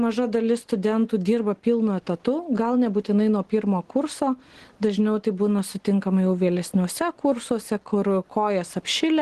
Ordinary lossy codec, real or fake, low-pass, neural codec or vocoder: Opus, 16 kbps; real; 10.8 kHz; none